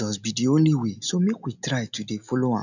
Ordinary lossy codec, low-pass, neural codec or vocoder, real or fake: none; 7.2 kHz; none; real